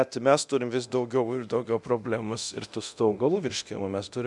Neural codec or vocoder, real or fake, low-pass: codec, 24 kHz, 0.9 kbps, DualCodec; fake; 10.8 kHz